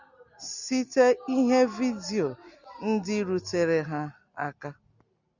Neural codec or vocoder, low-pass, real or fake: none; 7.2 kHz; real